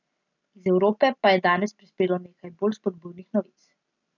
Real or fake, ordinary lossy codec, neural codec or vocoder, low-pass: real; none; none; 7.2 kHz